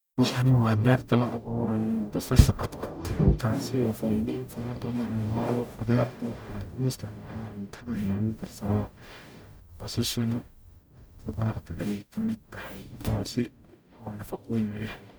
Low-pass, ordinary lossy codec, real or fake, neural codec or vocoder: none; none; fake; codec, 44.1 kHz, 0.9 kbps, DAC